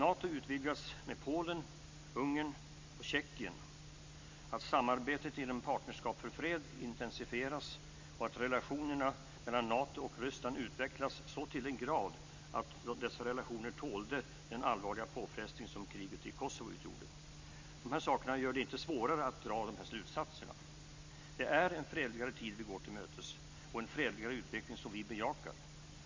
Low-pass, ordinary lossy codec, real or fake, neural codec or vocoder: 7.2 kHz; MP3, 48 kbps; real; none